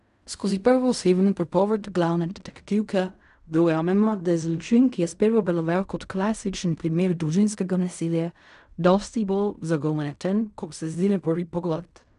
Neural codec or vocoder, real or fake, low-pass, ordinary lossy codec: codec, 16 kHz in and 24 kHz out, 0.4 kbps, LongCat-Audio-Codec, fine tuned four codebook decoder; fake; 10.8 kHz; none